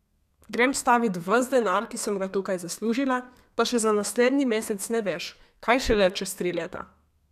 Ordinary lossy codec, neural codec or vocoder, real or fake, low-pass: none; codec, 32 kHz, 1.9 kbps, SNAC; fake; 14.4 kHz